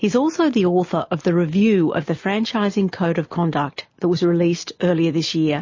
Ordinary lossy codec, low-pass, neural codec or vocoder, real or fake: MP3, 32 kbps; 7.2 kHz; none; real